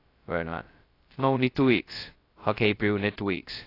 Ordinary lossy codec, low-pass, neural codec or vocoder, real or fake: AAC, 32 kbps; 5.4 kHz; codec, 16 kHz, 0.2 kbps, FocalCodec; fake